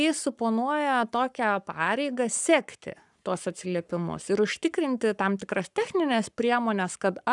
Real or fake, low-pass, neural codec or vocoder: fake; 10.8 kHz; codec, 44.1 kHz, 7.8 kbps, Pupu-Codec